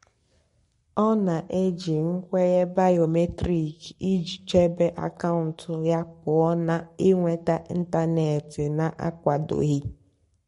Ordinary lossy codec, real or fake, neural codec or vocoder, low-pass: MP3, 48 kbps; fake; codec, 44.1 kHz, 7.8 kbps, Pupu-Codec; 19.8 kHz